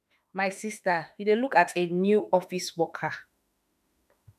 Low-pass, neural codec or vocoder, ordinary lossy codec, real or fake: 14.4 kHz; autoencoder, 48 kHz, 32 numbers a frame, DAC-VAE, trained on Japanese speech; none; fake